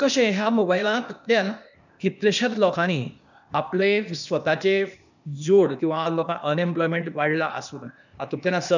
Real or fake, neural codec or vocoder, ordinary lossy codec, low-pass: fake; codec, 16 kHz, 0.8 kbps, ZipCodec; none; 7.2 kHz